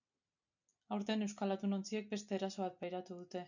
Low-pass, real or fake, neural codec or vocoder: 7.2 kHz; real; none